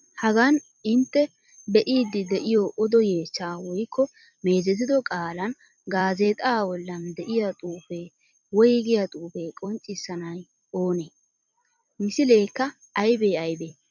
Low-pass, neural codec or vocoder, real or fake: 7.2 kHz; vocoder, 44.1 kHz, 128 mel bands every 256 samples, BigVGAN v2; fake